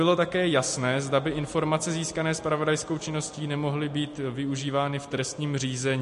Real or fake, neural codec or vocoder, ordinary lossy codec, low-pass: real; none; MP3, 48 kbps; 14.4 kHz